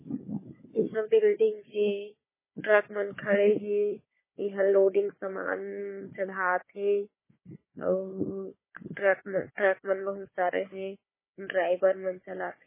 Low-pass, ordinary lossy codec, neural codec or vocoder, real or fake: 3.6 kHz; MP3, 16 kbps; codec, 16 kHz, 4 kbps, FunCodec, trained on Chinese and English, 50 frames a second; fake